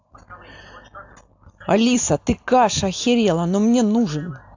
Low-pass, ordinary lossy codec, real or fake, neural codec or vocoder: 7.2 kHz; AAC, 48 kbps; real; none